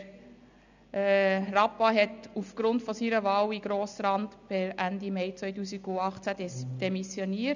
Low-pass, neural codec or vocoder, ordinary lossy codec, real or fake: 7.2 kHz; none; none; real